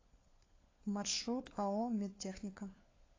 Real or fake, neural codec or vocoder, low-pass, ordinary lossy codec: fake; codec, 16 kHz, 4 kbps, FunCodec, trained on LibriTTS, 50 frames a second; 7.2 kHz; AAC, 32 kbps